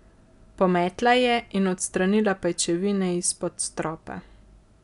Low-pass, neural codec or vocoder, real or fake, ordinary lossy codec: 10.8 kHz; none; real; none